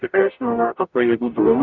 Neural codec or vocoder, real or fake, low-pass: codec, 44.1 kHz, 0.9 kbps, DAC; fake; 7.2 kHz